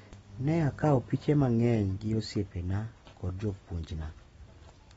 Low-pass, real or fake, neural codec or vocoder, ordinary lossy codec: 19.8 kHz; real; none; AAC, 24 kbps